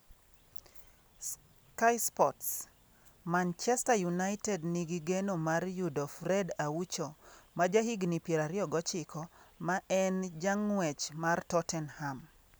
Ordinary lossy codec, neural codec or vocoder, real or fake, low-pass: none; none; real; none